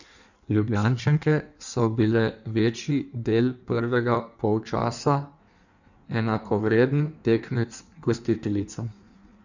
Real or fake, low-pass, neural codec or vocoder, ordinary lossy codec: fake; 7.2 kHz; codec, 16 kHz in and 24 kHz out, 1.1 kbps, FireRedTTS-2 codec; none